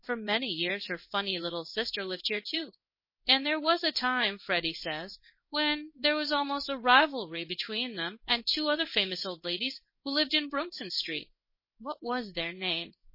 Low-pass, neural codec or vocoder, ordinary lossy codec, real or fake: 5.4 kHz; codec, 16 kHz in and 24 kHz out, 1 kbps, XY-Tokenizer; MP3, 24 kbps; fake